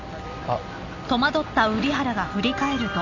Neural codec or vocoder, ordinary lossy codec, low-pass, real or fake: none; none; 7.2 kHz; real